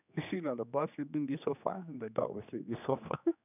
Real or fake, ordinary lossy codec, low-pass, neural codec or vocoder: fake; none; 3.6 kHz; codec, 16 kHz, 2 kbps, X-Codec, HuBERT features, trained on general audio